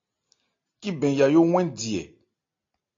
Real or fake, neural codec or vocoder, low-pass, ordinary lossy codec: real; none; 7.2 kHz; AAC, 48 kbps